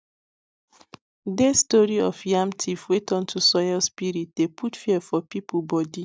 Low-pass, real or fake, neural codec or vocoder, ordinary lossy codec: none; real; none; none